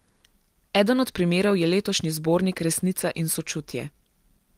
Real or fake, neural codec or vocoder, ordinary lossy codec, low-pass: real; none; Opus, 24 kbps; 14.4 kHz